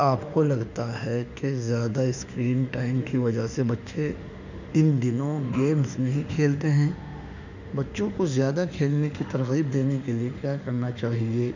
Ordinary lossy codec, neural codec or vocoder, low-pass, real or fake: none; autoencoder, 48 kHz, 32 numbers a frame, DAC-VAE, trained on Japanese speech; 7.2 kHz; fake